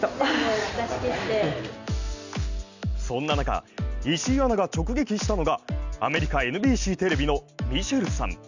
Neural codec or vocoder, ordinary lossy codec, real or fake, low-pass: none; none; real; 7.2 kHz